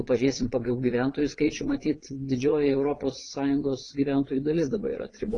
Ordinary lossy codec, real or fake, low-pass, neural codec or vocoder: AAC, 32 kbps; fake; 9.9 kHz; vocoder, 22.05 kHz, 80 mel bands, Vocos